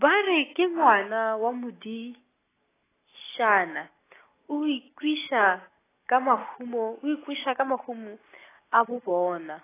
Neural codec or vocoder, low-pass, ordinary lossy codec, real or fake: none; 3.6 kHz; AAC, 16 kbps; real